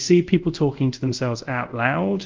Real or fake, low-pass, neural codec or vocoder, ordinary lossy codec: fake; 7.2 kHz; codec, 24 kHz, 0.5 kbps, DualCodec; Opus, 32 kbps